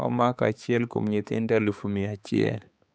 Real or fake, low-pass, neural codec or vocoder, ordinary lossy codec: fake; none; codec, 16 kHz, 4 kbps, X-Codec, HuBERT features, trained on balanced general audio; none